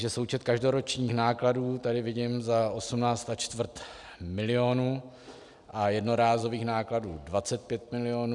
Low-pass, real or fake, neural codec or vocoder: 10.8 kHz; real; none